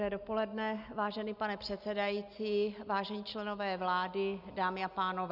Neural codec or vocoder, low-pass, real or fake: none; 5.4 kHz; real